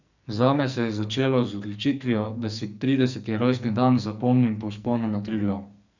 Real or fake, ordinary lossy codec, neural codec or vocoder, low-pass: fake; none; codec, 44.1 kHz, 2.6 kbps, SNAC; 7.2 kHz